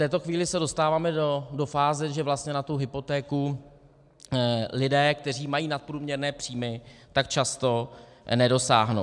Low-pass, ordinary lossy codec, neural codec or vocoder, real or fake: 10.8 kHz; MP3, 96 kbps; none; real